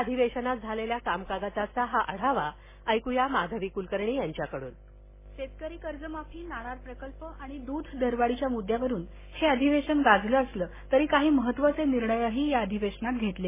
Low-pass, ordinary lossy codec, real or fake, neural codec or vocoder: 3.6 kHz; MP3, 16 kbps; real; none